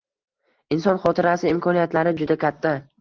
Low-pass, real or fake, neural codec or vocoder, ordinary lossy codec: 7.2 kHz; real; none; Opus, 16 kbps